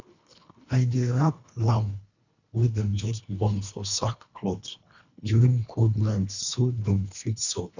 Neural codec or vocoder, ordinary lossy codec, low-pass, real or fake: codec, 24 kHz, 1.5 kbps, HILCodec; none; 7.2 kHz; fake